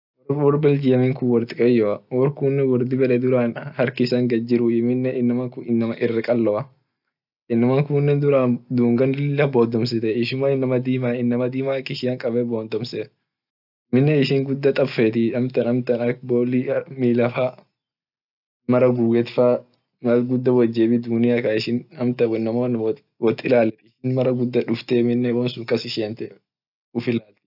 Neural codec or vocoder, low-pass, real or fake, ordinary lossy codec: none; 5.4 kHz; real; none